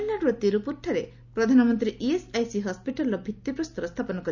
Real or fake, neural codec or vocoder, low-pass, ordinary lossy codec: real; none; 7.2 kHz; none